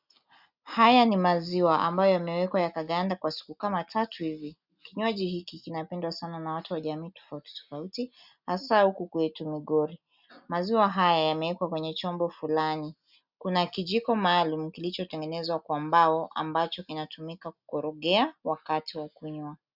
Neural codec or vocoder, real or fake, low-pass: none; real; 5.4 kHz